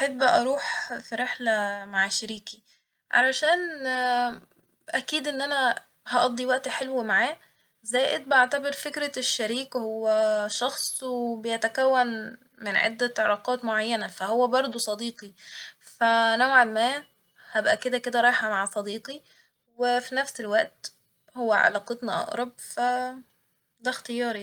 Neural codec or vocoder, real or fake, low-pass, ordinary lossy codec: none; real; 19.8 kHz; Opus, 32 kbps